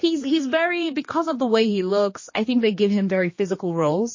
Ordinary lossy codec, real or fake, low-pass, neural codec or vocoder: MP3, 32 kbps; fake; 7.2 kHz; codec, 16 kHz, 2 kbps, X-Codec, HuBERT features, trained on general audio